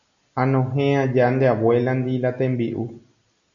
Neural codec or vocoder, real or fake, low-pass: none; real; 7.2 kHz